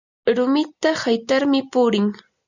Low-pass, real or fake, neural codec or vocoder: 7.2 kHz; real; none